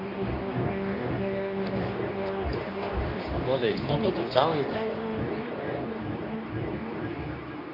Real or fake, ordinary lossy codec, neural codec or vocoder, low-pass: fake; AAC, 32 kbps; codec, 24 kHz, 0.9 kbps, WavTokenizer, medium speech release version 2; 5.4 kHz